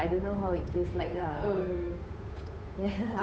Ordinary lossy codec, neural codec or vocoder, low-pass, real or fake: none; codec, 16 kHz, 8 kbps, FunCodec, trained on Chinese and English, 25 frames a second; none; fake